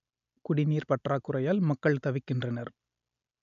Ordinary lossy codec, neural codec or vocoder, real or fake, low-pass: none; none; real; 7.2 kHz